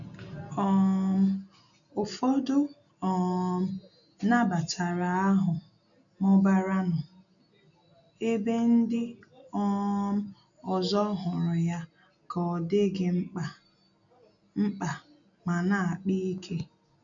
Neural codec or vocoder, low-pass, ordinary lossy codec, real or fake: none; 7.2 kHz; none; real